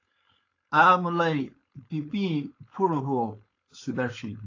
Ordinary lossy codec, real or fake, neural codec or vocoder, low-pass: AAC, 32 kbps; fake; codec, 16 kHz, 4.8 kbps, FACodec; 7.2 kHz